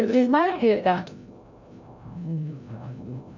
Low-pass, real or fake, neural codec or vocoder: 7.2 kHz; fake; codec, 16 kHz, 0.5 kbps, FreqCodec, larger model